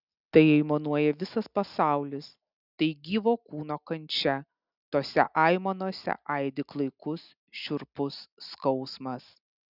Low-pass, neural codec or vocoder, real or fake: 5.4 kHz; none; real